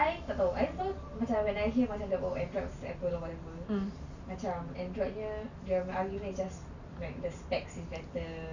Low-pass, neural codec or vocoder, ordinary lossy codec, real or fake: 7.2 kHz; none; none; real